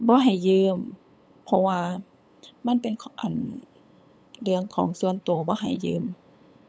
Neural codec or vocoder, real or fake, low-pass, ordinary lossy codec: codec, 16 kHz, 8 kbps, FunCodec, trained on LibriTTS, 25 frames a second; fake; none; none